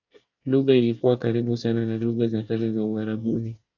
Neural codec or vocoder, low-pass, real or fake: codec, 24 kHz, 1 kbps, SNAC; 7.2 kHz; fake